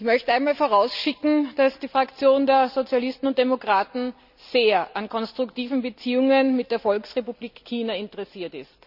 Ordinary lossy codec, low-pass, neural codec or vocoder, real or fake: none; 5.4 kHz; none; real